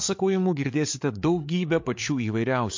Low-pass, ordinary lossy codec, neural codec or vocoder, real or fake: 7.2 kHz; MP3, 48 kbps; codec, 16 kHz, 8 kbps, FreqCodec, larger model; fake